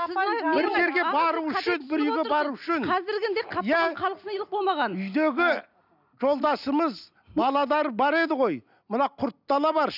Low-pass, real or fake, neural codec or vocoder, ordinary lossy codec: 5.4 kHz; real; none; none